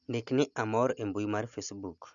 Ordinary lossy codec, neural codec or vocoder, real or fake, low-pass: none; none; real; 7.2 kHz